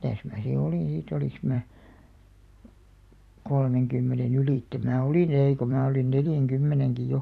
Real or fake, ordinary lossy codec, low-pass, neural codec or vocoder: fake; none; 14.4 kHz; vocoder, 44.1 kHz, 128 mel bands every 256 samples, BigVGAN v2